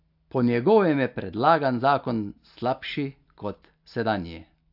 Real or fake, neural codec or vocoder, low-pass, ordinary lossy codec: real; none; 5.4 kHz; AAC, 48 kbps